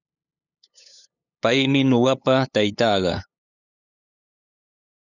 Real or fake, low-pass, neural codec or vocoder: fake; 7.2 kHz; codec, 16 kHz, 8 kbps, FunCodec, trained on LibriTTS, 25 frames a second